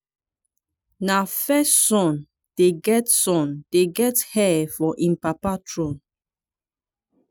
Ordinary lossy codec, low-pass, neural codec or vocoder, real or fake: none; none; none; real